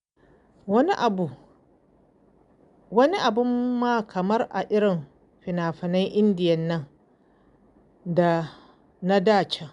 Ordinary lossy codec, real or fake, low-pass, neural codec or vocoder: none; real; 10.8 kHz; none